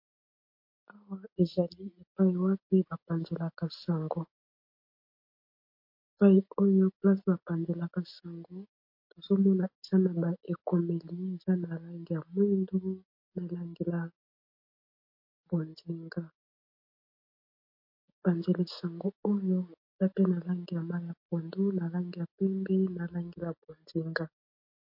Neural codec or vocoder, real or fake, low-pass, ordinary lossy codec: none; real; 5.4 kHz; MP3, 32 kbps